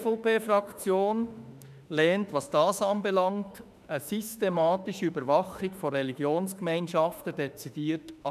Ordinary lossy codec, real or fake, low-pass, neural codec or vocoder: none; fake; 14.4 kHz; autoencoder, 48 kHz, 32 numbers a frame, DAC-VAE, trained on Japanese speech